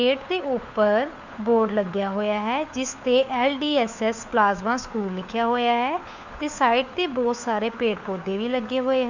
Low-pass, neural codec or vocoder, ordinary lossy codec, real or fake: 7.2 kHz; codec, 16 kHz, 4 kbps, FunCodec, trained on LibriTTS, 50 frames a second; none; fake